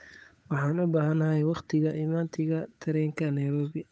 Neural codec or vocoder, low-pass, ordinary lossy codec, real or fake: codec, 16 kHz, 8 kbps, FunCodec, trained on Chinese and English, 25 frames a second; none; none; fake